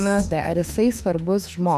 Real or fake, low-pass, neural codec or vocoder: fake; 14.4 kHz; autoencoder, 48 kHz, 32 numbers a frame, DAC-VAE, trained on Japanese speech